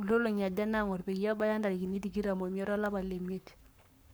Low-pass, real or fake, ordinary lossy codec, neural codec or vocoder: none; fake; none; codec, 44.1 kHz, 7.8 kbps, Pupu-Codec